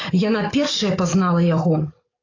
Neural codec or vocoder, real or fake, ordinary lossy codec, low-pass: codec, 44.1 kHz, 7.8 kbps, DAC; fake; AAC, 32 kbps; 7.2 kHz